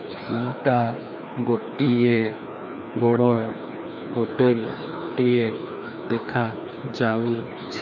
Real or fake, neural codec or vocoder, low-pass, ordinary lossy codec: fake; codec, 16 kHz, 2 kbps, FreqCodec, larger model; 7.2 kHz; none